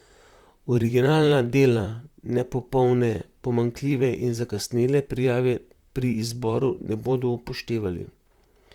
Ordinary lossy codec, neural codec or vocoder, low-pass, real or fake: Opus, 64 kbps; vocoder, 44.1 kHz, 128 mel bands, Pupu-Vocoder; 19.8 kHz; fake